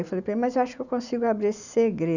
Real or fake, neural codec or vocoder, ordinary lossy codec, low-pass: real; none; none; 7.2 kHz